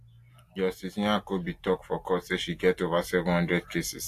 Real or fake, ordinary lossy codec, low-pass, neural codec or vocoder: real; AAC, 64 kbps; 14.4 kHz; none